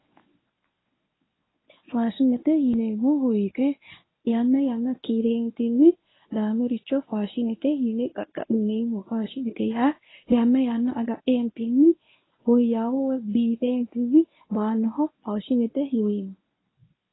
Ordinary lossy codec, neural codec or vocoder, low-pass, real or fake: AAC, 16 kbps; codec, 24 kHz, 0.9 kbps, WavTokenizer, medium speech release version 1; 7.2 kHz; fake